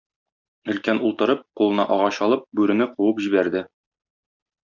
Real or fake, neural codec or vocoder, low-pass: real; none; 7.2 kHz